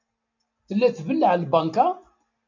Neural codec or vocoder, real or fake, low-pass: none; real; 7.2 kHz